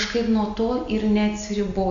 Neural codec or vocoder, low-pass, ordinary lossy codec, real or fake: none; 7.2 kHz; MP3, 48 kbps; real